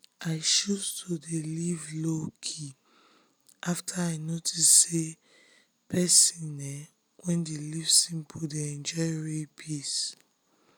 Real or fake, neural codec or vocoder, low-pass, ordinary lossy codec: real; none; none; none